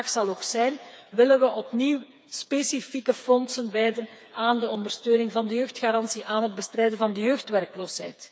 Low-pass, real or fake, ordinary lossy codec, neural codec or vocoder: none; fake; none; codec, 16 kHz, 4 kbps, FreqCodec, smaller model